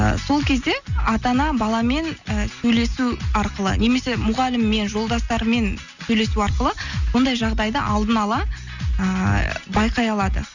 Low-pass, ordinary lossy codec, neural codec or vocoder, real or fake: 7.2 kHz; none; none; real